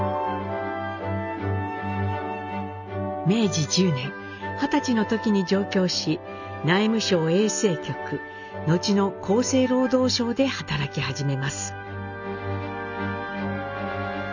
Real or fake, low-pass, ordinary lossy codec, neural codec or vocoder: real; 7.2 kHz; none; none